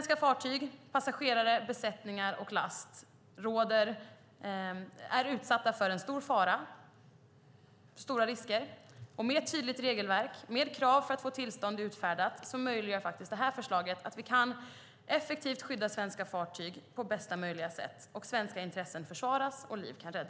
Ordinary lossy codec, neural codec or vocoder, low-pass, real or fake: none; none; none; real